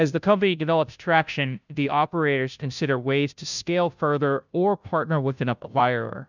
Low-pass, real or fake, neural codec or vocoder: 7.2 kHz; fake; codec, 16 kHz, 0.5 kbps, FunCodec, trained on Chinese and English, 25 frames a second